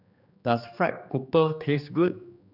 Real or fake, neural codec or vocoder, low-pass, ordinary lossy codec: fake; codec, 16 kHz, 2 kbps, X-Codec, HuBERT features, trained on general audio; 5.4 kHz; none